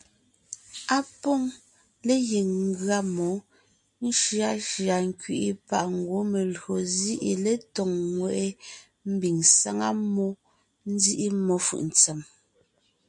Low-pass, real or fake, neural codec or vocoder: 10.8 kHz; real; none